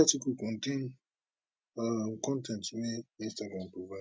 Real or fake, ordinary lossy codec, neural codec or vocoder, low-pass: real; none; none; none